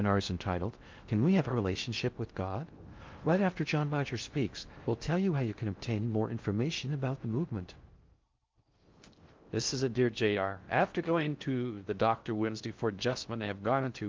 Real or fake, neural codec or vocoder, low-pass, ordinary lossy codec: fake; codec, 16 kHz in and 24 kHz out, 0.6 kbps, FocalCodec, streaming, 2048 codes; 7.2 kHz; Opus, 32 kbps